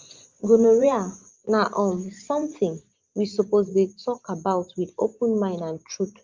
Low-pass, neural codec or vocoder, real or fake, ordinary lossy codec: 7.2 kHz; vocoder, 24 kHz, 100 mel bands, Vocos; fake; Opus, 32 kbps